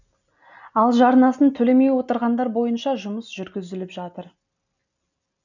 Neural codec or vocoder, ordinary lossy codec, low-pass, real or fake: none; none; 7.2 kHz; real